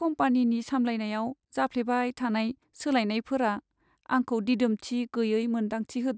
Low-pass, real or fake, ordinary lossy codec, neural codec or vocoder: none; real; none; none